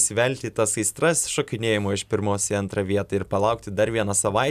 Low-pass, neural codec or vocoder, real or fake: 14.4 kHz; none; real